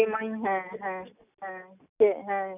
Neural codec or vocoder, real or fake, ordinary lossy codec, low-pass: none; real; none; 3.6 kHz